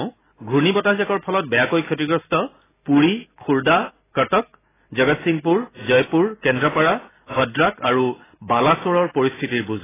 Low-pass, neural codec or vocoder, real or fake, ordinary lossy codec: 3.6 kHz; none; real; AAC, 16 kbps